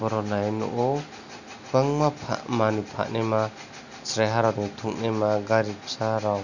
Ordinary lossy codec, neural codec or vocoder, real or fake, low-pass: none; none; real; 7.2 kHz